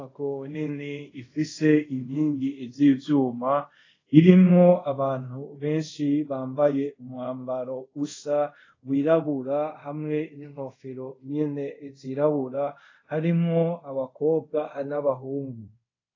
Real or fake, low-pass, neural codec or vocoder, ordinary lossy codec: fake; 7.2 kHz; codec, 24 kHz, 0.5 kbps, DualCodec; AAC, 32 kbps